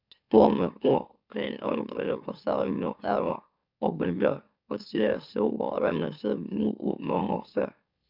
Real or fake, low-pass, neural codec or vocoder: fake; 5.4 kHz; autoencoder, 44.1 kHz, a latent of 192 numbers a frame, MeloTTS